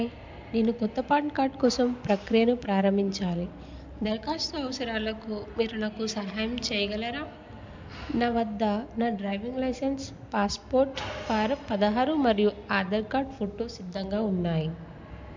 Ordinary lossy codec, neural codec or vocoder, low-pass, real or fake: MP3, 64 kbps; none; 7.2 kHz; real